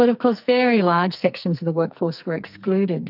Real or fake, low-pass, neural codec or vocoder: fake; 5.4 kHz; codec, 44.1 kHz, 2.6 kbps, SNAC